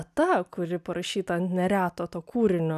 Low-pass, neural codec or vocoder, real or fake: 14.4 kHz; none; real